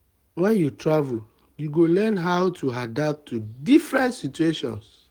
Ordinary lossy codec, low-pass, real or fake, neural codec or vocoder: Opus, 32 kbps; 19.8 kHz; fake; codec, 44.1 kHz, 7.8 kbps, DAC